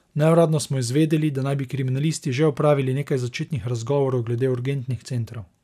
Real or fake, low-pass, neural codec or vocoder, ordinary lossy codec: real; 14.4 kHz; none; none